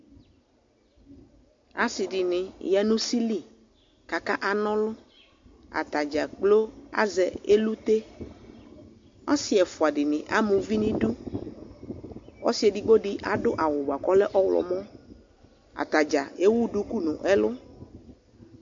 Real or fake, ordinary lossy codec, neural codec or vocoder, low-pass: real; MP3, 48 kbps; none; 7.2 kHz